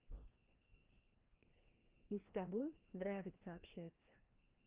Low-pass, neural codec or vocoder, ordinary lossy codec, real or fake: 3.6 kHz; codec, 16 kHz, 1 kbps, FreqCodec, larger model; Opus, 24 kbps; fake